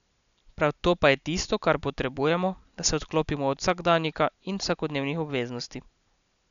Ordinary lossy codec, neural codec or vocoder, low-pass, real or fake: none; none; 7.2 kHz; real